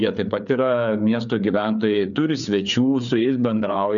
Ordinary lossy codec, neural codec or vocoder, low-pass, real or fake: MP3, 96 kbps; codec, 16 kHz, 4 kbps, FreqCodec, larger model; 7.2 kHz; fake